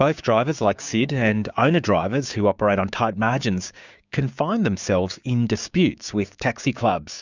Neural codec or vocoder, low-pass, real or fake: codec, 16 kHz, 6 kbps, DAC; 7.2 kHz; fake